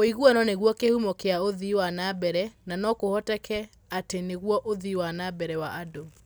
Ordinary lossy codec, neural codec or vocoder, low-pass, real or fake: none; none; none; real